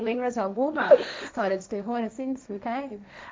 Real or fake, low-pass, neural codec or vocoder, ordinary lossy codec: fake; none; codec, 16 kHz, 1.1 kbps, Voila-Tokenizer; none